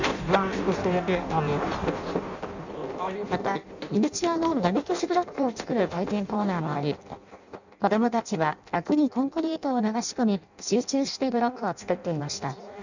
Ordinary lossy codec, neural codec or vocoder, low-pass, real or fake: none; codec, 16 kHz in and 24 kHz out, 0.6 kbps, FireRedTTS-2 codec; 7.2 kHz; fake